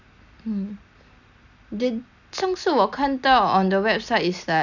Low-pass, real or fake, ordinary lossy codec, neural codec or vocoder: 7.2 kHz; real; none; none